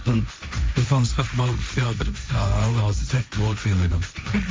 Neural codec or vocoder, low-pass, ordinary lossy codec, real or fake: codec, 16 kHz, 1.1 kbps, Voila-Tokenizer; none; none; fake